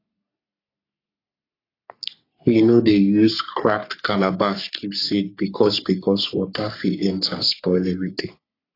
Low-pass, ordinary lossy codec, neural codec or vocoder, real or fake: 5.4 kHz; AAC, 32 kbps; codec, 44.1 kHz, 3.4 kbps, Pupu-Codec; fake